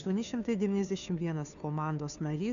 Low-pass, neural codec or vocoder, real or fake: 7.2 kHz; codec, 16 kHz, 2 kbps, FunCodec, trained on Chinese and English, 25 frames a second; fake